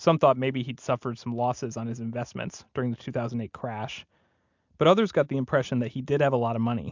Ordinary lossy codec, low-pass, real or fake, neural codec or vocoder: MP3, 64 kbps; 7.2 kHz; real; none